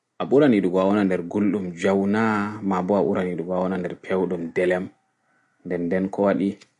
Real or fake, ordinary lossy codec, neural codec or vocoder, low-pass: real; MP3, 48 kbps; none; 14.4 kHz